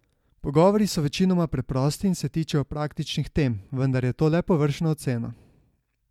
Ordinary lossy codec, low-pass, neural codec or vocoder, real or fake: MP3, 96 kbps; 19.8 kHz; none; real